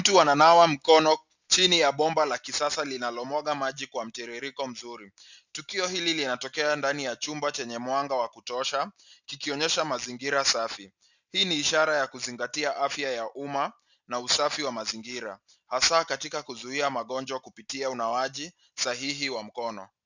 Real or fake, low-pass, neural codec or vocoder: real; 7.2 kHz; none